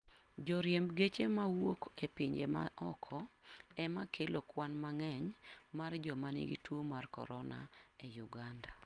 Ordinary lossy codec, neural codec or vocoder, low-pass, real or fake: Opus, 32 kbps; none; 9.9 kHz; real